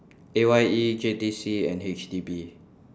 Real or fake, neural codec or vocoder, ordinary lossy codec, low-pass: real; none; none; none